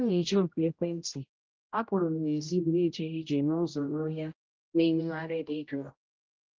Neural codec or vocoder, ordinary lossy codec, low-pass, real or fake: codec, 16 kHz, 0.5 kbps, X-Codec, HuBERT features, trained on general audio; Opus, 24 kbps; 7.2 kHz; fake